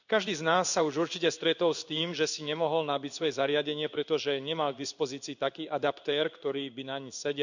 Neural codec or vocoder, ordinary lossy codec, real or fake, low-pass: codec, 16 kHz in and 24 kHz out, 1 kbps, XY-Tokenizer; none; fake; 7.2 kHz